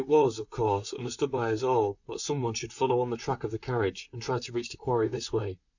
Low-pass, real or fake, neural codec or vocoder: 7.2 kHz; fake; vocoder, 44.1 kHz, 128 mel bands, Pupu-Vocoder